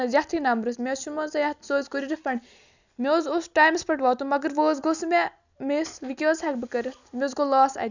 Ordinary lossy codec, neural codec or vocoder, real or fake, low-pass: none; none; real; 7.2 kHz